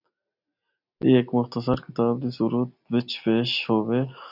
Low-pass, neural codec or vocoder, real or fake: 5.4 kHz; none; real